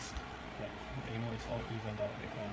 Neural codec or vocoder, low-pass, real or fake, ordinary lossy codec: codec, 16 kHz, 8 kbps, FreqCodec, larger model; none; fake; none